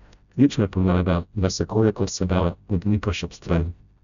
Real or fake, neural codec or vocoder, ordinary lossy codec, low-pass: fake; codec, 16 kHz, 0.5 kbps, FreqCodec, smaller model; none; 7.2 kHz